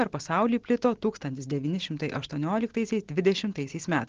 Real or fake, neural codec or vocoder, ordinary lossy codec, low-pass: real; none; Opus, 16 kbps; 7.2 kHz